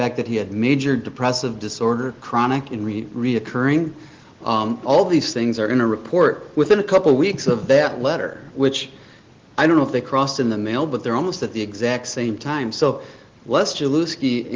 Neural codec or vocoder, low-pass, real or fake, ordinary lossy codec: none; 7.2 kHz; real; Opus, 16 kbps